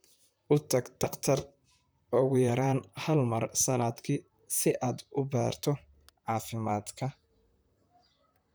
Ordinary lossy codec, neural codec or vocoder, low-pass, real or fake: none; vocoder, 44.1 kHz, 128 mel bands, Pupu-Vocoder; none; fake